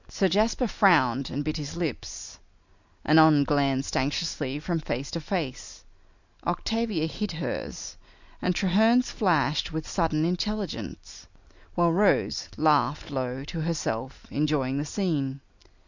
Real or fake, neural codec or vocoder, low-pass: real; none; 7.2 kHz